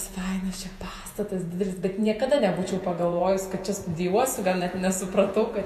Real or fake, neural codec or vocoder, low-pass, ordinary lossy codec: fake; vocoder, 48 kHz, 128 mel bands, Vocos; 14.4 kHz; MP3, 64 kbps